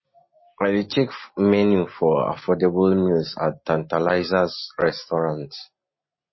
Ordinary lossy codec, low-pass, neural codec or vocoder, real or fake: MP3, 24 kbps; 7.2 kHz; none; real